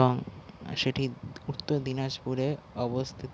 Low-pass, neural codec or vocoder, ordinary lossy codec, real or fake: none; none; none; real